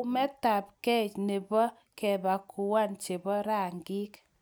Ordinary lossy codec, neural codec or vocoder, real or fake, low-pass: none; none; real; none